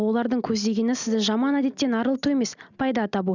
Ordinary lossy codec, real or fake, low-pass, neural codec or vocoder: none; real; 7.2 kHz; none